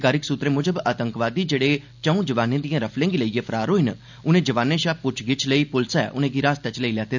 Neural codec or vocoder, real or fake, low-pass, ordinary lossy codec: none; real; 7.2 kHz; none